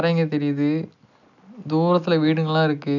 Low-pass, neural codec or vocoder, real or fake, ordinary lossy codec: 7.2 kHz; none; real; none